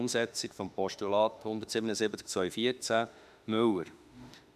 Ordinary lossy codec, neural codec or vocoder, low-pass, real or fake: none; autoencoder, 48 kHz, 32 numbers a frame, DAC-VAE, trained on Japanese speech; 14.4 kHz; fake